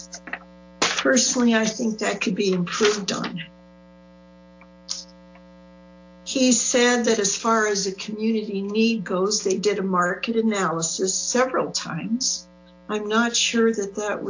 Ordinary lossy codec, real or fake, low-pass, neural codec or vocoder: AAC, 48 kbps; real; 7.2 kHz; none